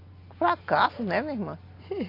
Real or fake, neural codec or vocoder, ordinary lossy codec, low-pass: real; none; AAC, 32 kbps; 5.4 kHz